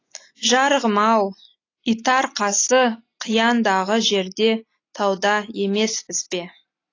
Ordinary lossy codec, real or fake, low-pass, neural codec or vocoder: AAC, 32 kbps; real; 7.2 kHz; none